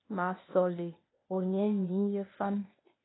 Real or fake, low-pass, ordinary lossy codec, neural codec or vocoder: fake; 7.2 kHz; AAC, 16 kbps; codec, 16 kHz, 0.8 kbps, ZipCodec